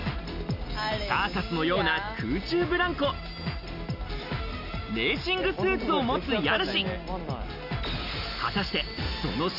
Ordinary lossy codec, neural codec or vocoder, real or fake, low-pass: none; none; real; 5.4 kHz